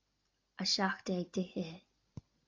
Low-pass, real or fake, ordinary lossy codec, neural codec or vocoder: 7.2 kHz; real; AAC, 48 kbps; none